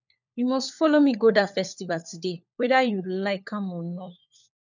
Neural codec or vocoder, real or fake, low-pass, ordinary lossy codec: codec, 16 kHz, 4 kbps, FunCodec, trained on LibriTTS, 50 frames a second; fake; 7.2 kHz; none